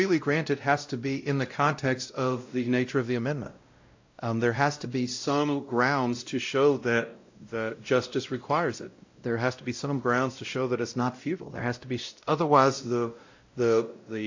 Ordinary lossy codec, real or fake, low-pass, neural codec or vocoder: AAC, 48 kbps; fake; 7.2 kHz; codec, 16 kHz, 0.5 kbps, X-Codec, WavLM features, trained on Multilingual LibriSpeech